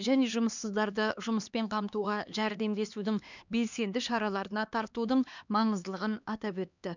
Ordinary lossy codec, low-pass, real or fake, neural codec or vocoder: none; 7.2 kHz; fake; codec, 16 kHz, 2 kbps, X-Codec, HuBERT features, trained on LibriSpeech